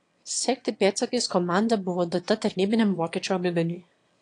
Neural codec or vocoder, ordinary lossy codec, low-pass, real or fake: autoencoder, 22.05 kHz, a latent of 192 numbers a frame, VITS, trained on one speaker; AAC, 48 kbps; 9.9 kHz; fake